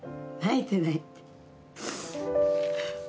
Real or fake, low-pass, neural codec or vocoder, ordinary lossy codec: real; none; none; none